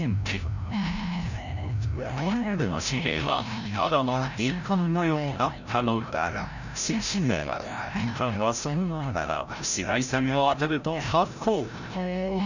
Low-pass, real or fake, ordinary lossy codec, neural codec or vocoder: 7.2 kHz; fake; none; codec, 16 kHz, 0.5 kbps, FreqCodec, larger model